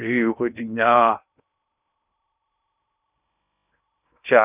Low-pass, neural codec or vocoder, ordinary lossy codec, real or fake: 3.6 kHz; codec, 16 kHz in and 24 kHz out, 0.6 kbps, FocalCodec, streaming, 2048 codes; none; fake